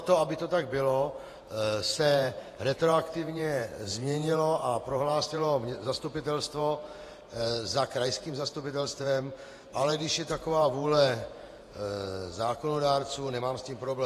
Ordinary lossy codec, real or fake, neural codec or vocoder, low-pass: AAC, 48 kbps; fake; vocoder, 48 kHz, 128 mel bands, Vocos; 14.4 kHz